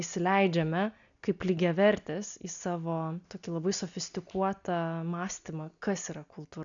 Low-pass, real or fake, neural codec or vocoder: 7.2 kHz; real; none